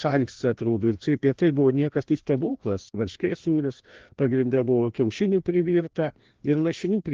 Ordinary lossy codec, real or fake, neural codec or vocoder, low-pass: Opus, 16 kbps; fake; codec, 16 kHz, 1 kbps, FreqCodec, larger model; 7.2 kHz